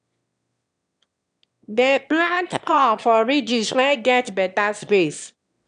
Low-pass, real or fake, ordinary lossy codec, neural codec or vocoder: 9.9 kHz; fake; none; autoencoder, 22.05 kHz, a latent of 192 numbers a frame, VITS, trained on one speaker